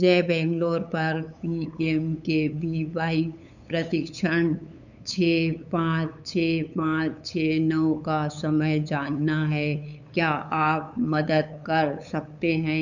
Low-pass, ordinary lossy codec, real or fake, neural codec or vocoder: 7.2 kHz; none; fake; codec, 16 kHz, 8 kbps, FunCodec, trained on LibriTTS, 25 frames a second